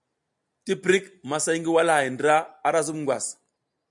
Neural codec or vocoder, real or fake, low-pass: none; real; 10.8 kHz